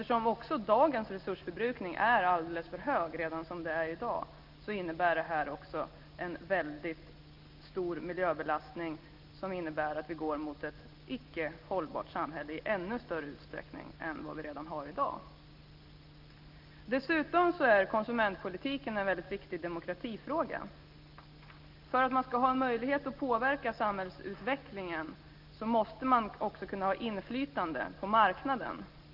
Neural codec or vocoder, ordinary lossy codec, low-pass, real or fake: none; Opus, 32 kbps; 5.4 kHz; real